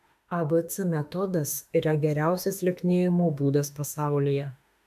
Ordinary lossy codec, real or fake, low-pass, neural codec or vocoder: AAC, 96 kbps; fake; 14.4 kHz; autoencoder, 48 kHz, 32 numbers a frame, DAC-VAE, trained on Japanese speech